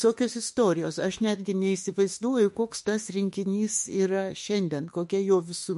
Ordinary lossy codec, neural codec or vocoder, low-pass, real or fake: MP3, 48 kbps; autoencoder, 48 kHz, 32 numbers a frame, DAC-VAE, trained on Japanese speech; 14.4 kHz; fake